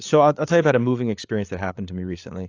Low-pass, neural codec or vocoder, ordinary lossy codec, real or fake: 7.2 kHz; codec, 16 kHz, 8 kbps, FreqCodec, larger model; AAC, 48 kbps; fake